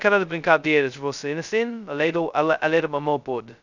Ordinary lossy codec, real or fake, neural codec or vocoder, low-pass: none; fake; codec, 16 kHz, 0.2 kbps, FocalCodec; 7.2 kHz